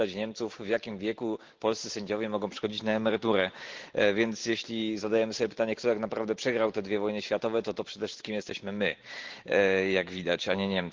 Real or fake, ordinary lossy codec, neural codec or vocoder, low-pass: real; Opus, 16 kbps; none; 7.2 kHz